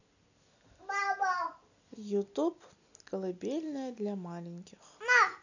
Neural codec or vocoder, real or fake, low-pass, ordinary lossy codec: none; real; 7.2 kHz; none